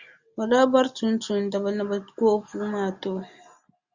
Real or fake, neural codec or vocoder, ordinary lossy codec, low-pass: real; none; Opus, 64 kbps; 7.2 kHz